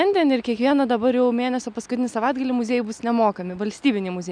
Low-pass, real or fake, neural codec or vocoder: 9.9 kHz; real; none